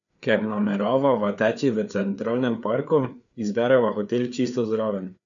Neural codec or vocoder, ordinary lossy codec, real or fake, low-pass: codec, 16 kHz, 4 kbps, FreqCodec, larger model; none; fake; 7.2 kHz